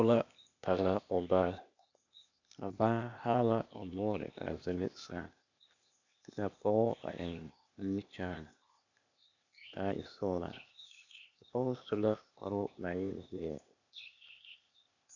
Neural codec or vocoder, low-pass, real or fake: codec, 16 kHz, 0.8 kbps, ZipCodec; 7.2 kHz; fake